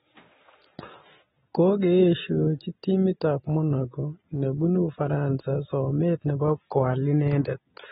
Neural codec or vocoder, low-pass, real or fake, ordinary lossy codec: none; 7.2 kHz; real; AAC, 16 kbps